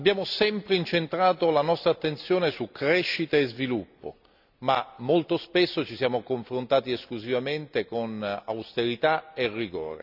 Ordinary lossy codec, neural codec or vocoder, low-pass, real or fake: none; none; 5.4 kHz; real